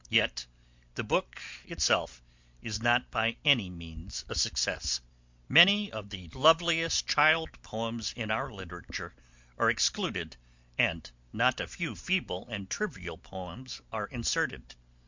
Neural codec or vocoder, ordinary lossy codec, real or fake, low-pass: none; MP3, 64 kbps; real; 7.2 kHz